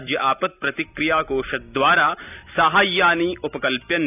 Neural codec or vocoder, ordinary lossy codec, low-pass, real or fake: none; none; 3.6 kHz; real